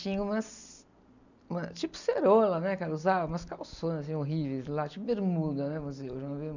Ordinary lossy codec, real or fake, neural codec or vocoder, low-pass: none; real; none; 7.2 kHz